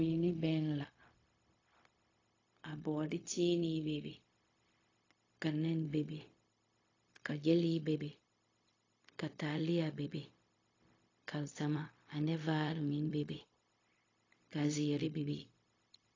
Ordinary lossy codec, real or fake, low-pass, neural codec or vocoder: AAC, 32 kbps; fake; 7.2 kHz; codec, 16 kHz, 0.4 kbps, LongCat-Audio-Codec